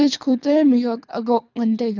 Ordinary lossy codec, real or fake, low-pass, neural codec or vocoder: none; fake; 7.2 kHz; codec, 24 kHz, 3 kbps, HILCodec